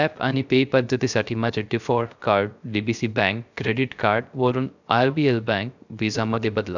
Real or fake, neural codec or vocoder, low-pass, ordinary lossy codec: fake; codec, 16 kHz, 0.3 kbps, FocalCodec; 7.2 kHz; none